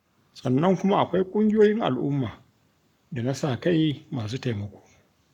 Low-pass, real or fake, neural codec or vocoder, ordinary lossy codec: 19.8 kHz; fake; codec, 44.1 kHz, 7.8 kbps, Pupu-Codec; none